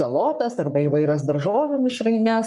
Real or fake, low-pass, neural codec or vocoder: fake; 10.8 kHz; codec, 44.1 kHz, 3.4 kbps, Pupu-Codec